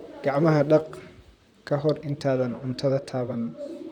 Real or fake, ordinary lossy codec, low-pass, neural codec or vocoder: fake; none; 19.8 kHz; vocoder, 44.1 kHz, 128 mel bands, Pupu-Vocoder